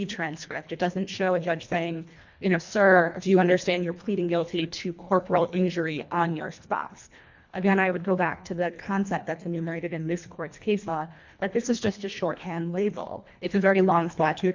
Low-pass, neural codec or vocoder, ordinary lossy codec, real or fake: 7.2 kHz; codec, 24 kHz, 1.5 kbps, HILCodec; MP3, 64 kbps; fake